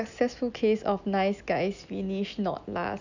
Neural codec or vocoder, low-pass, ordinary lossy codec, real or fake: none; 7.2 kHz; none; real